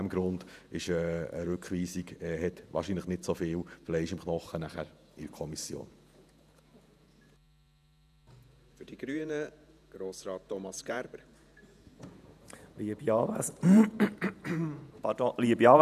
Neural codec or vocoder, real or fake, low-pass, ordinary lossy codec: vocoder, 48 kHz, 128 mel bands, Vocos; fake; 14.4 kHz; none